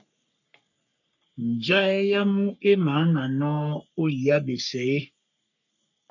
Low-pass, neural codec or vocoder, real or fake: 7.2 kHz; codec, 44.1 kHz, 3.4 kbps, Pupu-Codec; fake